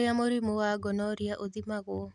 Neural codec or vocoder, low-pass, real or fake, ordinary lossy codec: none; none; real; none